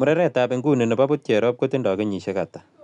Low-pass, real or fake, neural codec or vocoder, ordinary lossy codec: 10.8 kHz; real; none; none